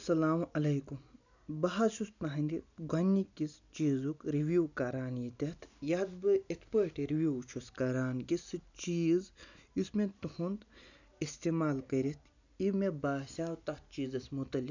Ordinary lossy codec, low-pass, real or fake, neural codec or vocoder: none; 7.2 kHz; real; none